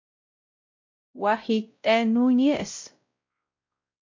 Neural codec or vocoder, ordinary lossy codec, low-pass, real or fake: codec, 16 kHz, 0.5 kbps, X-Codec, WavLM features, trained on Multilingual LibriSpeech; MP3, 48 kbps; 7.2 kHz; fake